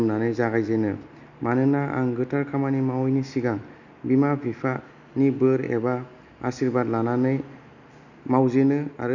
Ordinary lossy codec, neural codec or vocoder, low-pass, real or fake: none; none; 7.2 kHz; real